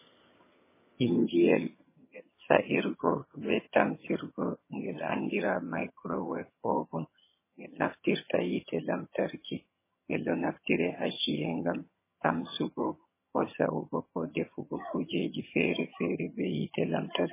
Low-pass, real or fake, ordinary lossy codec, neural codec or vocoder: 3.6 kHz; fake; MP3, 16 kbps; vocoder, 22.05 kHz, 80 mel bands, HiFi-GAN